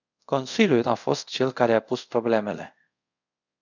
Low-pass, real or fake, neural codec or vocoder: 7.2 kHz; fake; codec, 24 kHz, 0.5 kbps, DualCodec